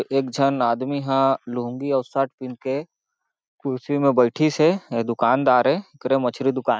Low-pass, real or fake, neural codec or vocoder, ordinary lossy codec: none; real; none; none